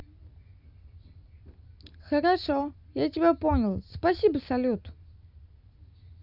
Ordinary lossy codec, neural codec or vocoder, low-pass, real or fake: none; none; 5.4 kHz; real